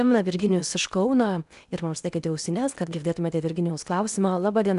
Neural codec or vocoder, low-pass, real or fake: codec, 16 kHz in and 24 kHz out, 0.8 kbps, FocalCodec, streaming, 65536 codes; 10.8 kHz; fake